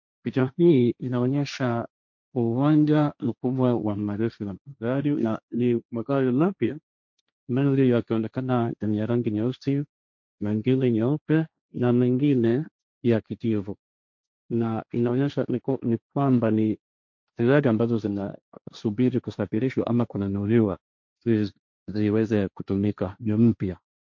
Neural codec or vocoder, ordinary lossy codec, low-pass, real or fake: codec, 16 kHz, 1.1 kbps, Voila-Tokenizer; MP3, 48 kbps; 7.2 kHz; fake